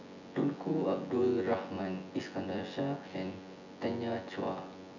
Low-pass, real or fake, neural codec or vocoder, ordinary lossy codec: 7.2 kHz; fake; vocoder, 24 kHz, 100 mel bands, Vocos; none